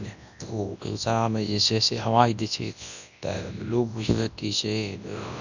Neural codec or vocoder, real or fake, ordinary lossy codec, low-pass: codec, 24 kHz, 0.9 kbps, WavTokenizer, large speech release; fake; none; 7.2 kHz